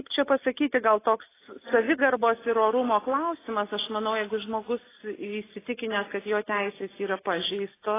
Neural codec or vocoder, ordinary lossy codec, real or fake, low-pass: none; AAC, 16 kbps; real; 3.6 kHz